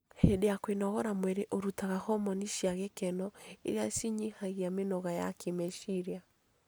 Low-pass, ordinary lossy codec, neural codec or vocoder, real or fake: none; none; none; real